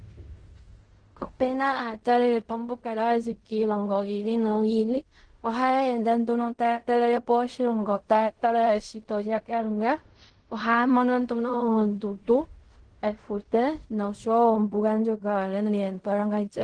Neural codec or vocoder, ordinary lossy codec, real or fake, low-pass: codec, 16 kHz in and 24 kHz out, 0.4 kbps, LongCat-Audio-Codec, fine tuned four codebook decoder; Opus, 16 kbps; fake; 9.9 kHz